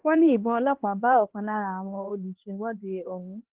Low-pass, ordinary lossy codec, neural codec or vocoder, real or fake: 3.6 kHz; Opus, 16 kbps; codec, 16 kHz, 2 kbps, X-Codec, HuBERT features, trained on LibriSpeech; fake